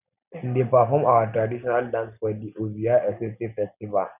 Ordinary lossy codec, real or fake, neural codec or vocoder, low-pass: none; real; none; 3.6 kHz